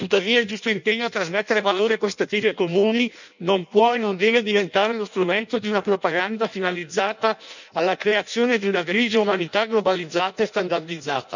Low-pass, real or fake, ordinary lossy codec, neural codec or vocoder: 7.2 kHz; fake; none; codec, 16 kHz in and 24 kHz out, 0.6 kbps, FireRedTTS-2 codec